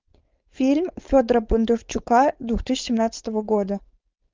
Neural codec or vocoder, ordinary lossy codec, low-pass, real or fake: codec, 16 kHz, 4.8 kbps, FACodec; Opus, 32 kbps; 7.2 kHz; fake